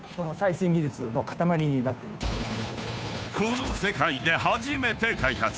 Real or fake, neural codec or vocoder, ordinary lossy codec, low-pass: fake; codec, 16 kHz, 2 kbps, FunCodec, trained on Chinese and English, 25 frames a second; none; none